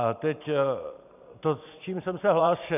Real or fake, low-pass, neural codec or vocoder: fake; 3.6 kHz; vocoder, 44.1 kHz, 128 mel bands every 512 samples, BigVGAN v2